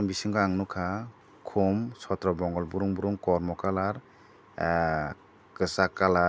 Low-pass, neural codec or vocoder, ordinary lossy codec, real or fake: none; none; none; real